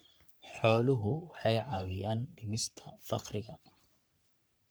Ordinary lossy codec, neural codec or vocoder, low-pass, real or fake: none; codec, 44.1 kHz, 3.4 kbps, Pupu-Codec; none; fake